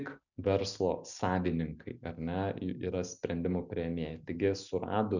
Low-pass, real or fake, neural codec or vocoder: 7.2 kHz; real; none